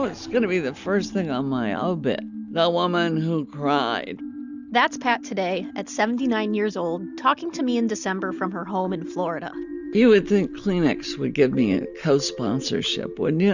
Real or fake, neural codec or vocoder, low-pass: real; none; 7.2 kHz